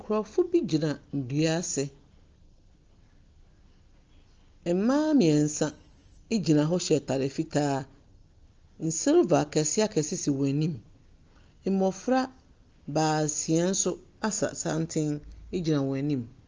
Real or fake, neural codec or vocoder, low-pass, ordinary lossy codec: real; none; 7.2 kHz; Opus, 24 kbps